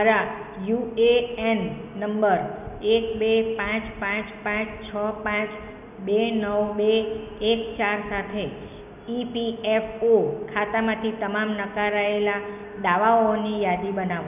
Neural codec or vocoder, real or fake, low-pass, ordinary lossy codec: none; real; 3.6 kHz; none